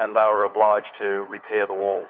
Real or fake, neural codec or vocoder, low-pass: fake; codec, 16 kHz, 4 kbps, FunCodec, trained on LibriTTS, 50 frames a second; 5.4 kHz